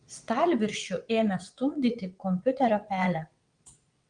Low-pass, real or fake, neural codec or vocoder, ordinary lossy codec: 9.9 kHz; fake; vocoder, 22.05 kHz, 80 mel bands, WaveNeXt; Opus, 32 kbps